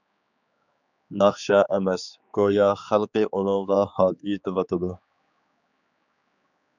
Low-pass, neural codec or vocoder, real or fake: 7.2 kHz; codec, 16 kHz, 4 kbps, X-Codec, HuBERT features, trained on general audio; fake